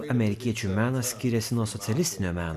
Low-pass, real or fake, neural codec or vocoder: 14.4 kHz; real; none